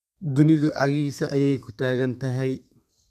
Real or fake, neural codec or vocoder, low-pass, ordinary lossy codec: fake; codec, 32 kHz, 1.9 kbps, SNAC; 14.4 kHz; none